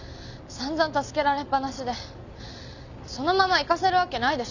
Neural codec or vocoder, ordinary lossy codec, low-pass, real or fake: none; none; 7.2 kHz; real